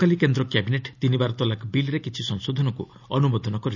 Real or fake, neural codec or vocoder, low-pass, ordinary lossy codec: real; none; 7.2 kHz; none